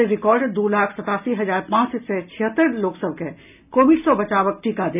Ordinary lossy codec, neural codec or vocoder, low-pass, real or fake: none; none; 3.6 kHz; real